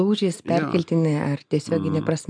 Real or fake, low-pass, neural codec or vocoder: real; 9.9 kHz; none